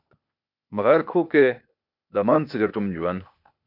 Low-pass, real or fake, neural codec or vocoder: 5.4 kHz; fake; codec, 16 kHz, 0.8 kbps, ZipCodec